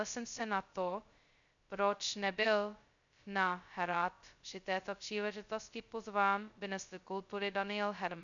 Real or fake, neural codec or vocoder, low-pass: fake; codec, 16 kHz, 0.2 kbps, FocalCodec; 7.2 kHz